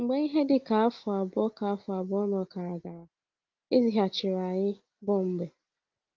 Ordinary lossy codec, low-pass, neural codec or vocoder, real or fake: Opus, 32 kbps; 7.2 kHz; none; real